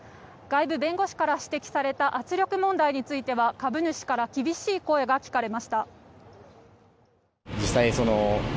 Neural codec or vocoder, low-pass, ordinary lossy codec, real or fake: none; none; none; real